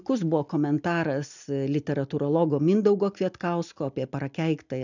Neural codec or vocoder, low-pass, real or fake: none; 7.2 kHz; real